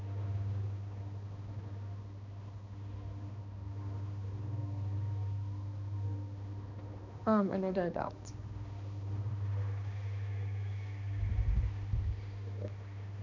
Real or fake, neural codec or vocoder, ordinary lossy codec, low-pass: fake; codec, 16 kHz, 2 kbps, X-Codec, HuBERT features, trained on balanced general audio; none; 7.2 kHz